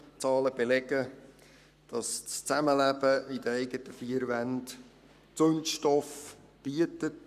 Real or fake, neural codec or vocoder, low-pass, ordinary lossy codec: fake; codec, 44.1 kHz, 7.8 kbps, Pupu-Codec; 14.4 kHz; none